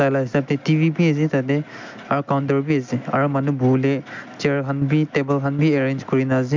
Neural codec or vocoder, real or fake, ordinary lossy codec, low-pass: none; real; MP3, 64 kbps; 7.2 kHz